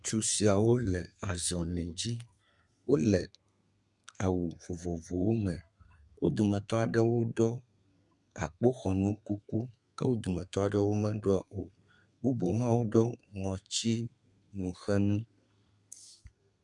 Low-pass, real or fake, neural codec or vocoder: 10.8 kHz; fake; codec, 32 kHz, 1.9 kbps, SNAC